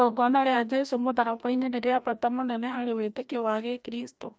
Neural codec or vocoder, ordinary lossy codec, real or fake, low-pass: codec, 16 kHz, 1 kbps, FreqCodec, larger model; none; fake; none